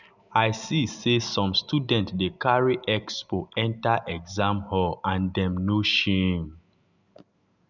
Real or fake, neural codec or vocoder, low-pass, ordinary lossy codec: real; none; 7.2 kHz; none